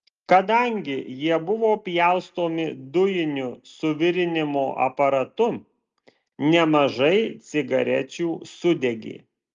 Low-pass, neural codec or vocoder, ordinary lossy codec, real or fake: 7.2 kHz; none; Opus, 32 kbps; real